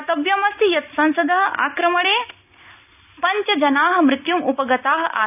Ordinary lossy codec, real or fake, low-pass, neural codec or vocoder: none; real; 3.6 kHz; none